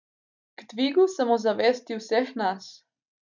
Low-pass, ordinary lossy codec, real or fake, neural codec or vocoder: 7.2 kHz; none; real; none